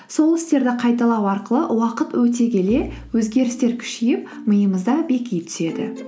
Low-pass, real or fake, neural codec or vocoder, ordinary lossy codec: none; real; none; none